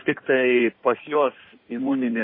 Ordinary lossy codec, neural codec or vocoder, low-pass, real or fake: MP3, 24 kbps; codec, 16 kHz in and 24 kHz out, 1.1 kbps, FireRedTTS-2 codec; 5.4 kHz; fake